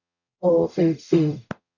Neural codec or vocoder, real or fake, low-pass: codec, 44.1 kHz, 0.9 kbps, DAC; fake; 7.2 kHz